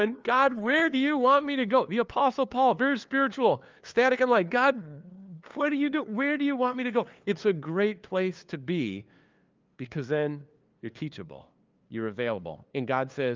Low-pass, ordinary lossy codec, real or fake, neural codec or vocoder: 7.2 kHz; Opus, 24 kbps; fake; codec, 16 kHz, 2 kbps, FunCodec, trained on LibriTTS, 25 frames a second